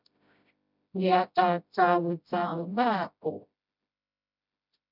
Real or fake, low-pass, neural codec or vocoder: fake; 5.4 kHz; codec, 16 kHz, 0.5 kbps, FreqCodec, smaller model